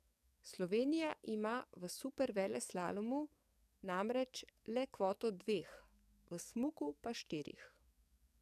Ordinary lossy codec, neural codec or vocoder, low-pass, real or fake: none; codec, 44.1 kHz, 7.8 kbps, DAC; 14.4 kHz; fake